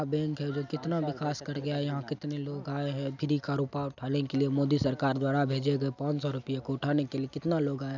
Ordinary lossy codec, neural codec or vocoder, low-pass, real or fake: none; none; 7.2 kHz; real